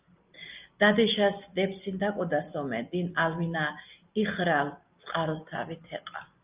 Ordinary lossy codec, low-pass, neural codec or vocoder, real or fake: Opus, 24 kbps; 3.6 kHz; none; real